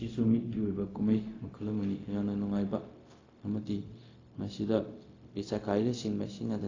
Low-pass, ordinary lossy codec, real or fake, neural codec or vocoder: 7.2 kHz; AAC, 32 kbps; fake; codec, 16 kHz, 0.4 kbps, LongCat-Audio-Codec